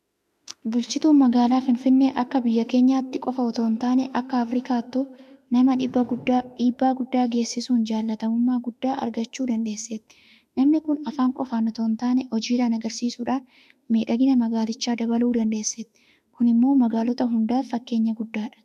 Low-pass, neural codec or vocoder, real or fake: 14.4 kHz; autoencoder, 48 kHz, 32 numbers a frame, DAC-VAE, trained on Japanese speech; fake